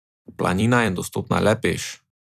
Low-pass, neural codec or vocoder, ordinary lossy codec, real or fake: 14.4 kHz; vocoder, 44.1 kHz, 128 mel bands every 256 samples, BigVGAN v2; none; fake